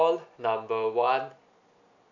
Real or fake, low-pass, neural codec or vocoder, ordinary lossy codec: real; 7.2 kHz; none; none